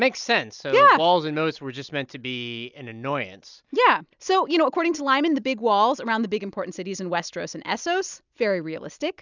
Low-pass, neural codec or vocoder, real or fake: 7.2 kHz; none; real